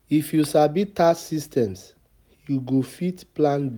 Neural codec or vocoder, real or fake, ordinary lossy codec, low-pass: none; real; none; none